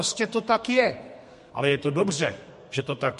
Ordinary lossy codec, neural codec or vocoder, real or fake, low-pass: MP3, 48 kbps; codec, 32 kHz, 1.9 kbps, SNAC; fake; 14.4 kHz